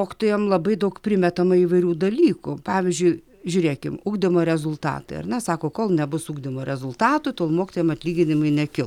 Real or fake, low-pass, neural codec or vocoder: real; 19.8 kHz; none